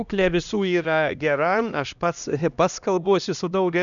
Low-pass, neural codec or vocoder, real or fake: 7.2 kHz; codec, 16 kHz, 1 kbps, X-Codec, HuBERT features, trained on LibriSpeech; fake